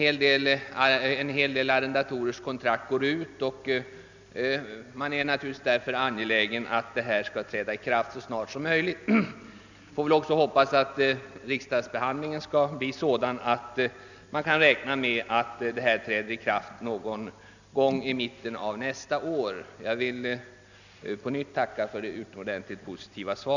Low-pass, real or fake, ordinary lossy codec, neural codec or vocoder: 7.2 kHz; real; none; none